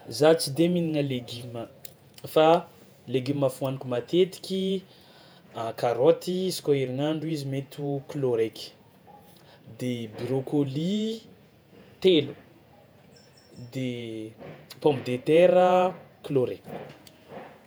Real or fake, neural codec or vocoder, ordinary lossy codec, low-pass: fake; vocoder, 48 kHz, 128 mel bands, Vocos; none; none